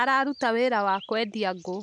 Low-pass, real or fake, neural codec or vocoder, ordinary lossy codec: 10.8 kHz; real; none; none